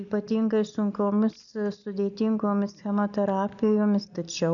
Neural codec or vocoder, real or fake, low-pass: none; real; 7.2 kHz